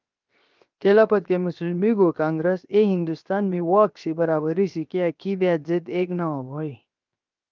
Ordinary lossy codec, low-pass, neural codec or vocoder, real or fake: Opus, 24 kbps; 7.2 kHz; codec, 16 kHz, 0.7 kbps, FocalCodec; fake